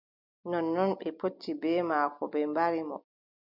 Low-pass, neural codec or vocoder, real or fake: 5.4 kHz; none; real